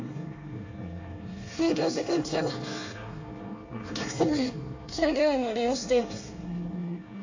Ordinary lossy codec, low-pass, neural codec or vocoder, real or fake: AAC, 48 kbps; 7.2 kHz; codec, 24 kHz, 1 kbps, SNAC; fake